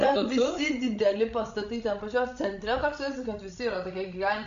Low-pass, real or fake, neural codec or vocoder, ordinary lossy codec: 7.2 kHz; fake; codec, 16 kHz, 16 kbps, FreqCodec, larger model; AAC, 64 kbps